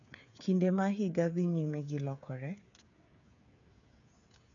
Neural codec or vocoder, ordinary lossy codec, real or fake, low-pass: codec, 16 kHz, 8 kbps, FreqCodec, smaller model; none; fake; 7.2 kHz